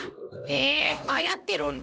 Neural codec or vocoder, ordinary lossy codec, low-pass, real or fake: codec, 16 kHz, 1 kbps, X-Codec, HuBERT features, trained on LibriSpeech; none; none; fake